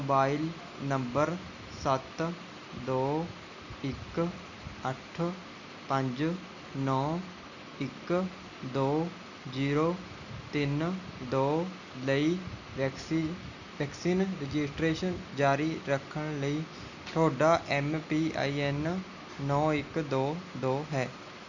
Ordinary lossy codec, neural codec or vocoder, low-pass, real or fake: none; none; 7.2 kHz; real